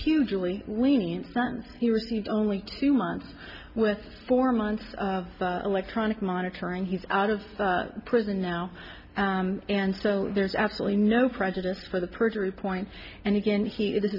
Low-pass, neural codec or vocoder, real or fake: 5.4 kHz; none; real